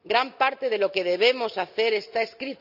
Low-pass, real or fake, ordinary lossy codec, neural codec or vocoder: 5.4 kHz; real; none; none